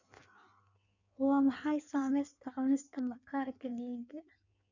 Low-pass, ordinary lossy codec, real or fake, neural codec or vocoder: 7.2 kHz; none; fake; codec, 16 kHz in and 24 kHz out, 1.1 kbps, FireRedTTS-2 codec